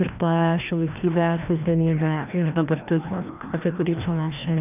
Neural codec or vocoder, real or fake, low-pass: codec, 16 kHz, 1 kbps, FreqCodec, larger model; fake; 3.6 kHz